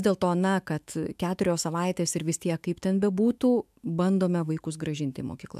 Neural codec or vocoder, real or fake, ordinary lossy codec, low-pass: autoencoder, 48 kHz, 128 numbers a frame, DAC-VAE, trained on Japanese speech; fake; MP3, 96 kbps; 14.4 kHz